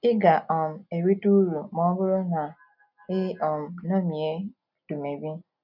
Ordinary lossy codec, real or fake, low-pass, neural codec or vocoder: none; real; 5.4 kHz; none